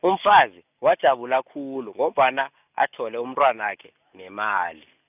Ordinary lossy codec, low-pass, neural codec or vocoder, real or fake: none; 3.6 kHz; none; real